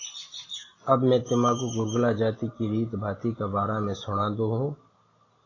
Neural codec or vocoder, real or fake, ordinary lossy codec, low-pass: none; real; AAC, 32 kbps; 7.2 kHz